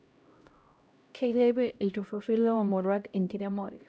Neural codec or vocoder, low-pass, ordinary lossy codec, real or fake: codec, 16 kHz, 0.5 kbps, X-Codec, HuBERT features, trained on LibriSpeech; none; none; fake